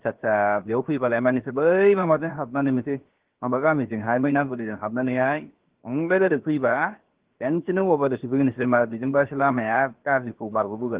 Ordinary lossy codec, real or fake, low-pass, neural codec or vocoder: Opus, 16 kbps; fake; 3.6 kHz; codec, 16 kHz, 0.7 kbps, FocalCodec